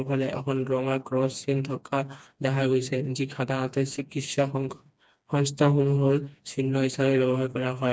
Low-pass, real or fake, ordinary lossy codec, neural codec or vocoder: none; fake; none; codec, 16 kHz, 2 kbps, FreqCodec, smaller model